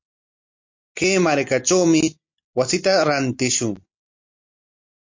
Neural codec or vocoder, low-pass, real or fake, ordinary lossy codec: none; 7.2 kHz; real; MP3, 48 kbps